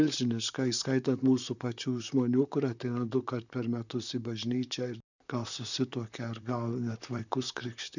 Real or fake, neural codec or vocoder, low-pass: fake; vocoder, 44.1 kHz, 128 mel bands, Pupu-Vocoder; 7.2 kHz